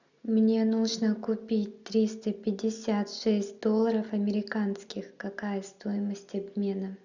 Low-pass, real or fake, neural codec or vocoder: 7.2 kHz; real; none